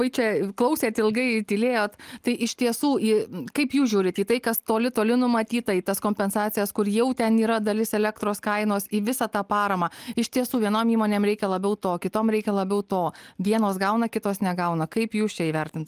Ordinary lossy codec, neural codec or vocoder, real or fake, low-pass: Opus, 32 kbps; none; real; 14.4 kHz